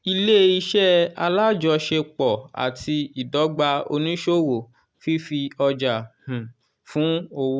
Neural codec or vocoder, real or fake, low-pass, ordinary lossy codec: none; real; none; none